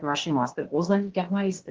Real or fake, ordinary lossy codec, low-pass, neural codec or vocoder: fake; Opus, 16 kbps; 7.2 kHz; codec, 16 kHz, 0.8 kbps, ZipCodec